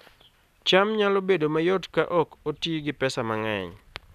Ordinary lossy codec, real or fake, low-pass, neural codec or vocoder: none; real; 14.4 kHz; none